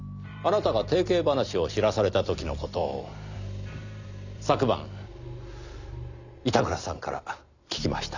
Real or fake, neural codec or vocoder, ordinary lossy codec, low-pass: real; none; none; 7.2 kHz